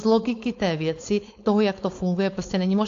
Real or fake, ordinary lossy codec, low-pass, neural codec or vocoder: fake; AAC, 48 kbps; 7.2 kHz; codec, 16 kHz, 4.8 kbps, FACodec